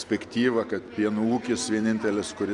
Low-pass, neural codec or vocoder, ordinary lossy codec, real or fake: 10.8 kHz; none; AAC, 64 kbps; real